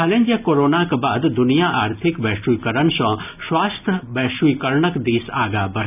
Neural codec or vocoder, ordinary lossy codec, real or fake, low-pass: none; none; real; 3.6 kHz